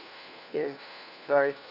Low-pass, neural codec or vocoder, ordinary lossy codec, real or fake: 5.4 kHz; codec, 16 kHz, 1 kbps, FunCodec, trained on LibriTTS, 50 frames a second; none; fake